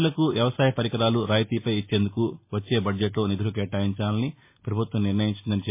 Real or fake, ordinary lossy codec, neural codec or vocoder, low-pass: real; MP3, 24 kbps; none; 3.6 kHz